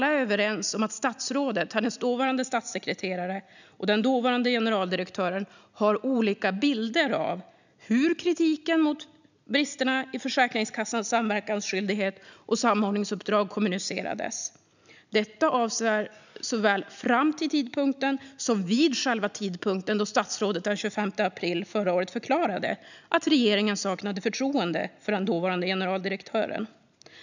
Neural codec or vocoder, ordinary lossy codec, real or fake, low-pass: none; none; real; 7.2 kHz